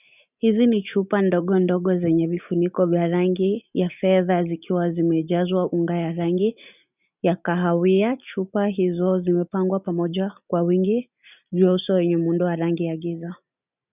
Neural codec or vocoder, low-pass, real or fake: none; 3.6 kHz; real